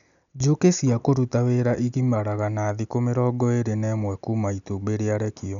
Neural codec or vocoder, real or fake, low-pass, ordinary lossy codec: none; real; 7.2 kHz; none